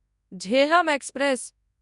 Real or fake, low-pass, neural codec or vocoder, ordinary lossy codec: fake; 10.8 kHz; codec, 24 kHz, 0.9 kbps, WavTokenizer, large speech release; none